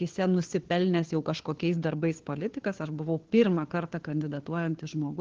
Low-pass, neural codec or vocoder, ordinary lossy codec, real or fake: 7.2 kHz; codec, 16 kHz, 4 kbps, FunCodec, trained on LibriTTS, 50 frames a second; Opus, 16 kbps; fake